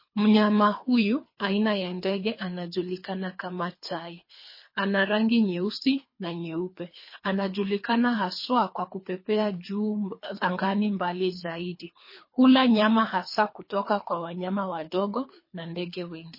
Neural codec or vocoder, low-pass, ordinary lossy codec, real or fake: codec, 24 kHz, 3 kbps, HILCodec; 5.4 kHz; MP3, 24 kbps; fake